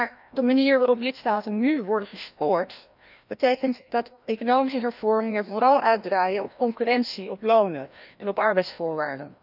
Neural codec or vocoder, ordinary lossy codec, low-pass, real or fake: codec, 16 kHz, 1 kbps, FreqCodec, larger model; none; 5.4 kHz; fake